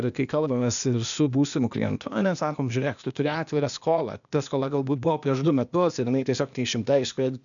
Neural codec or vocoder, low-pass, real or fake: codec, 16 kHz, 0.8 kbps, ZipCodec; 7.2 kHz; fake